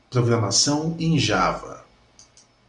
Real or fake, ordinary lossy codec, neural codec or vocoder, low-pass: real; MP3, 96 kbps; none; 10.8 kHz